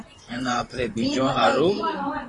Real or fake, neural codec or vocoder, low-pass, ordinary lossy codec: fake; vocoder, 44.1 kHz, 128 mel bands, Pupu-Vocoder; 10.8 kHz; AAC, 32 kbps